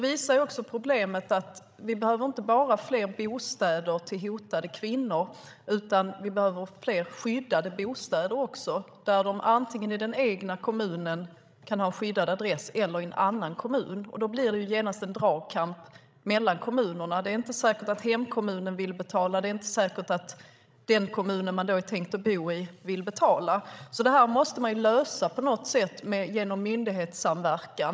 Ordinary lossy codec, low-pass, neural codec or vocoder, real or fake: none; none; codec, 16 kHz, 16 kbps, FreqCodec, larger model; fake